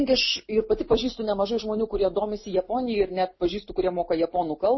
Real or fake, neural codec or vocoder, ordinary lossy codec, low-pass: real; none; MP3, 24 kbps; 7.2 kHz